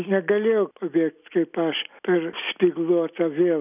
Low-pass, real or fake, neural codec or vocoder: 3.6 kHz; real; none